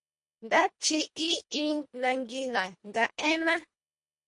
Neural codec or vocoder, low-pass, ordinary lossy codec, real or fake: codec, 24 kHz, 1.5 kbps, HILCodec; 10.8 kHz; MP3, 48 kbps; fake